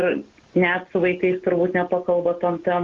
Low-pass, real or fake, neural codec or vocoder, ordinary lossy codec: 7.2 kHz; real; none; Opus, 16 kbps